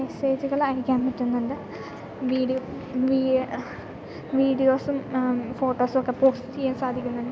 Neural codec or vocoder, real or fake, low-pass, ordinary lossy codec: none; real; none; none